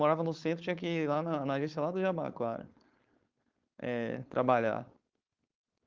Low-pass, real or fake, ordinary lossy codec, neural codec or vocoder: 7.2 kHz; fake; Opus, 32 kbps; codec, 16 kHz, 4.8 kbps, FACodec